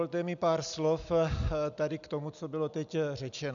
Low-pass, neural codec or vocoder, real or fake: 7.2 kHz; none; real